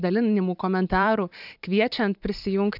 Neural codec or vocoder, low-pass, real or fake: vocoder, 44.1 kHz, 128 mel bands every 512 samples, BigVGAN v2; 5.4 kHz; fake